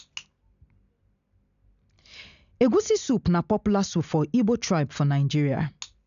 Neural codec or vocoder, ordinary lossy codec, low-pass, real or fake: none; none; 7.2 kHz; real